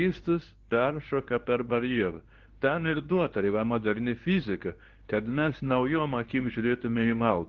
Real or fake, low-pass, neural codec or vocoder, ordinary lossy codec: fake; 7.2 kHz; codec, 24 kHz, 0.9 kbps, WavTokenizer, medium speech release version 2; Opus, 16 kbps